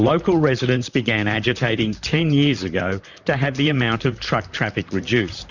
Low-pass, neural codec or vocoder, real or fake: 7.2 kHz; vocoder, 44.1 kHz, 128 mel bands, Pupu-Vocoder; fake